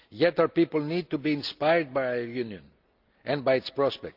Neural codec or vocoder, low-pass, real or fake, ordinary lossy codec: none; 5.4 kHz; real; Opus, 24 kbps